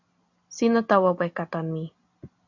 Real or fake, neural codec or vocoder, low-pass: real; none; 7.2 kHz